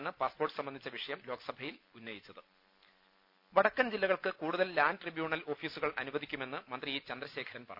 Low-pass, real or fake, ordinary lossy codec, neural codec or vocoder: 5.4 kHz; real; none; none